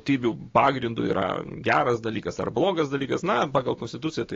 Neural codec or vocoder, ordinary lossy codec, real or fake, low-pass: codec, 16 kHz, 6 kbps, DAC; AAC, 32 kbps; fake; 7.2 kHz